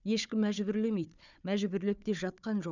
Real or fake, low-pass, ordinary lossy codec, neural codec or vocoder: fake; 7.2 kHz; none; codec, 16 kHz, 4 kbps, FunCodec, trained on Chinese and English, 50 frames a second